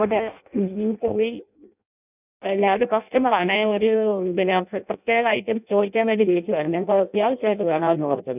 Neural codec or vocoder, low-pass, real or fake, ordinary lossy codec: codec, 16 kHz in and 24 kHz out, 0.6 kbps, FireRedTTS-2 codec; 3.6 kHz; fake; none